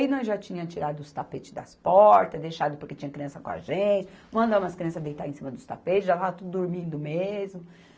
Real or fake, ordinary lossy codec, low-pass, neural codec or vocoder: real; none; none; none